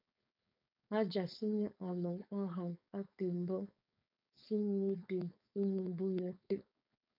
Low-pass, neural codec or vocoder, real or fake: 5.4 kHz; codec, 16 kHz, 4.8 kbps, FACodec; fake